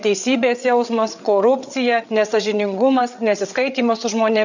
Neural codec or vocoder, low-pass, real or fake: codec, 16 kHz, 8 kbps, FreqCodec, larger model; 7.2 kHz; fake